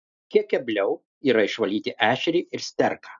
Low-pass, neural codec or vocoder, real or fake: 7.2 kHz; none; real